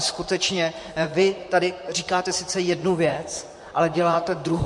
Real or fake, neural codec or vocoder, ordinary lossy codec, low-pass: fake; vocoder, 44.1 kHz, 128 mel bands, Pupu-Vocoder; MP3, 48 kbps; 10.8 kHz